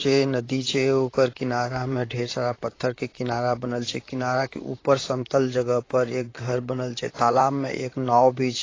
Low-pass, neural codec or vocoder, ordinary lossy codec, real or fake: 7.2 kHz; vocoder, 44.1 kHz, 128 mel bands, Pupu-Vocoder; AAC, 32 kbps; fake